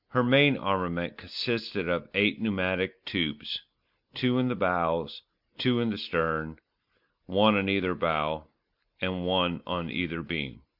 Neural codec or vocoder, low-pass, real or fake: none; 5.4 kHz; real